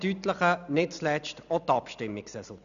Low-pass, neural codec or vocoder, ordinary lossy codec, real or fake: 7.2 kHz; none; none; real